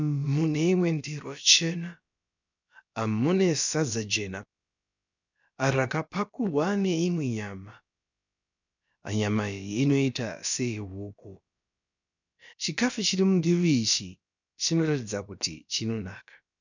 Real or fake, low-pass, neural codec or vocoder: fake; 7.2 kHz; codec, 16 kHz, about 1 kbps, DyCAST, with the encoder's durations